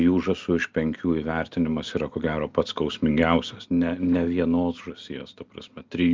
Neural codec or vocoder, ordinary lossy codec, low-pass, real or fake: none; Opus, 32 kbps; 7.2 kHz; real